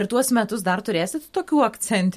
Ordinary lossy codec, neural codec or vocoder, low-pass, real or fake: MP3, 64 kbps; none; 14.4 kHz; real